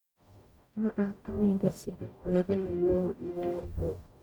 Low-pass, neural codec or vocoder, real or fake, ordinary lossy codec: 19.8 kHz; codec, 44.1 kHz, 0.9 kbps, DAC; fake; none